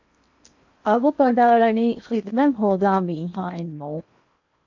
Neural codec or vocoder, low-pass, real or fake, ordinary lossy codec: codec, 16 kHz in and 24 kHz out, 0.8 kbps, FocalCodec, streaming, 65536 codes; 7.2 kHz; fake; AAC, 48 kbps